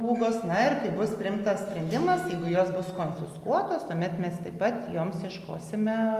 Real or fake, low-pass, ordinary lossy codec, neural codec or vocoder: real; 14.4 kHz; Opus, 32 kbps; none